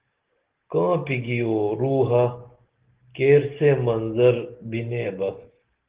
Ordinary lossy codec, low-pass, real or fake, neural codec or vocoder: Opus, 16 kbps; 3.6 kHz; real; none